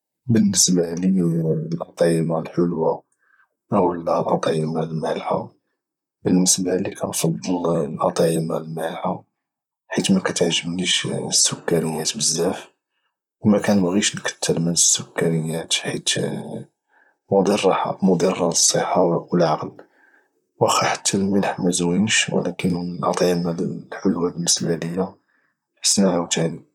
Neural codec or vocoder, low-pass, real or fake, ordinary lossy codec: vocoder, 44.1 kHz, 128 mel bands, Pupu-Vocoder; 19.8 kHz; fake; none